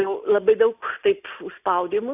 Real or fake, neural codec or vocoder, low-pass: real; none; 3.6 kHz